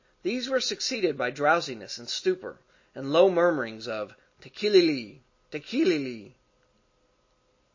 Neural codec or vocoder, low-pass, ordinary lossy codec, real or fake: none; 7.2 kHz; MP3, 32 kbps; real